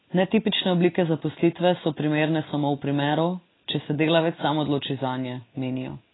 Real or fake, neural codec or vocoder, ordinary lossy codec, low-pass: real; none; AAC, 16 kbps; 7.2 kHz